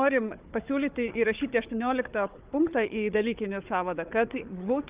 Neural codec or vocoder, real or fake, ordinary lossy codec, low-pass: codec, 16 kHz, 4 kbps, FunCodec, trained on Chinese and English, 50 frames a second; fake; Opus, 24 kbps; 3.6 kHz